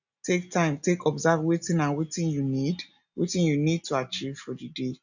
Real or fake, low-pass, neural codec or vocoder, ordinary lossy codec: real; 7.2 kHz; none; none